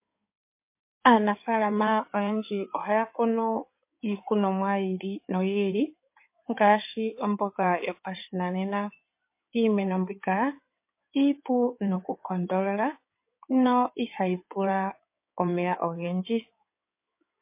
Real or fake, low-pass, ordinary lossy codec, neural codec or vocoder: fake; 3.6 kHz; MP3, 24 kbps; codec, 16 kHz in and 24 kHz out, 2.2 kbps, FireRedTTS-2 codec